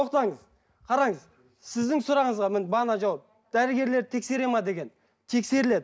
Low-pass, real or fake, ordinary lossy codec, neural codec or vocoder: none; real; none; none